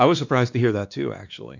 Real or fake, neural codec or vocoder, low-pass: fake; codec, 16 kHz, 2 kbps, X-Codec, WavLM features, trained on Multilingual LibriSpeech; 7.2 kHz